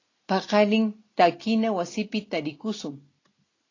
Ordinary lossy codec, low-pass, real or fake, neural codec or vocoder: AAC, 32 kbps; 7.2 kHz; real; none